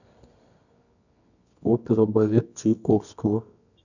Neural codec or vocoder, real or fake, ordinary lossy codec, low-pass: codec, 24 kHz, 0.9 kbps, WavTokenizer, medium music audio release; fake; none; 7.2 kHz